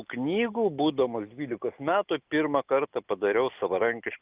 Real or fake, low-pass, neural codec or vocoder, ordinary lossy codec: real; 3.6 kHz; none; Opus, 24 kbps